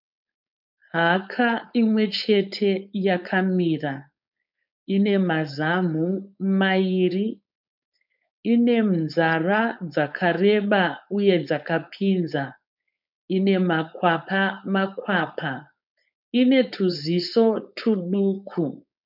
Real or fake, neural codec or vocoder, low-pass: fake; codec, 16 kHz, 4.8 kbps, FACodec; 5.4 kHz